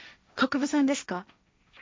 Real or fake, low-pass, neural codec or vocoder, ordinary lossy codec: fake; none; codec, 16 kHz, 1.1 kbps, Voila-Tokenizer; none